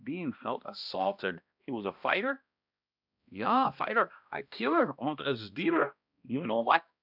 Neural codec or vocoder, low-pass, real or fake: codec, 16 kHz, 1 kbps, X-Codec, HuBERT features, trained on balanced general audio; 5.4 kHz; fake